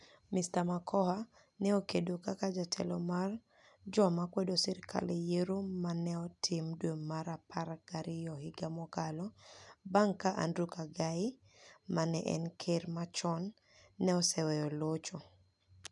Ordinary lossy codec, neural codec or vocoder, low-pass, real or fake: none; none; 10.8 kHz; real